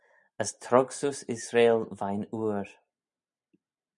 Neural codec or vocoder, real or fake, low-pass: none; real; 10.8 kHz